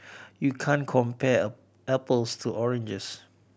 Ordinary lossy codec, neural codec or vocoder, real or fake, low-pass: none; none; real; none